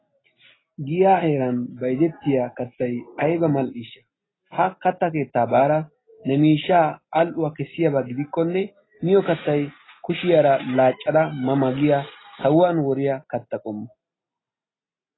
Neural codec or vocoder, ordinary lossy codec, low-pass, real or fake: none; AAC, 16 kbps; 7.2 kHz; real